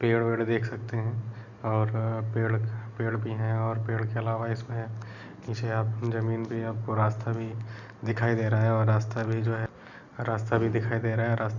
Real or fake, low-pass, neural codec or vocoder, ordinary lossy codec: real; 7.2 kHz; none; none